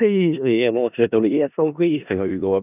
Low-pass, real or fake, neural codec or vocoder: 3.6 kHz; fake; codec, 16 kHz in and 24 kHz out, 0.4 kbps, LongCat-Audio-Codec, four codebook decoder